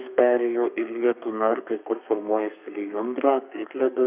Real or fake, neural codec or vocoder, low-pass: fake; codec, 32 kHz, 1.9 kbps, SNAC; 3.6 kHz